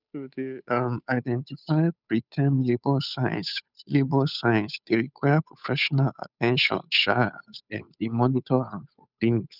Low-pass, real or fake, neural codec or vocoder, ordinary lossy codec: 5.4 kHz; fake; codec, 16 kHz, 8 kbps, FunCodec, trained on Chinese and English, 25 frames a second; none